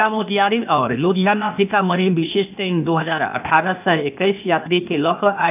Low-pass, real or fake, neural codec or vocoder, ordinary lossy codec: 3.6 kHz; fake; codec, 16 kHz, 0.8 kbps, ZipCodec; none